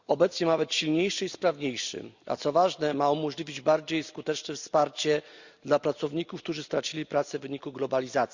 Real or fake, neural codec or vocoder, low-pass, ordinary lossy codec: real; none; 7.2 kHz; Opus, 64 kbps